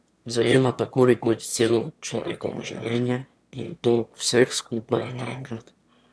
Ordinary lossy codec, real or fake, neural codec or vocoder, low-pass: none; fake; autoencoder, 22.05 kHz, a latent of 192 numbers a frame, VITS, trained on one speaker; none